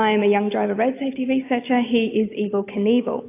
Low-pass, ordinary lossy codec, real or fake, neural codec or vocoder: 3.6 kHz; AAC, 24 kbps; real; none